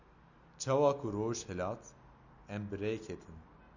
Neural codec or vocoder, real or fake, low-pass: none; real; 7.2 kHz